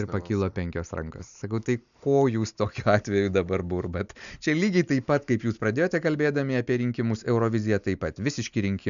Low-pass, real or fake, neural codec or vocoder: 7.2 kHz; real; none